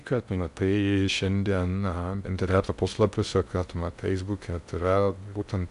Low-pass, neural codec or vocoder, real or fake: 10.8 kHz; codec, 16 kHz in and 24 kHz out, 0.6 kbps, FocalCodec, streaming, 2048 codes; fake